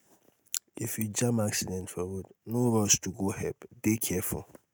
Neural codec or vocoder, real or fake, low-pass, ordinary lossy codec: none; real; none; none